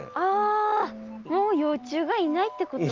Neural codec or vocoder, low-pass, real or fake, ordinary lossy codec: none; 7.2 kHz; real; Opus, 32 kbps